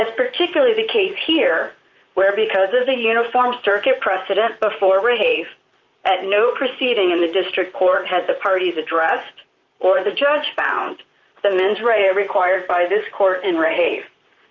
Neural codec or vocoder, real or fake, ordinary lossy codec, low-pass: vocoder, 44.1 kHz, 128 mel bands, Pupu-Vocoder; fake; Opus, 24 kbps; 7.2 kHz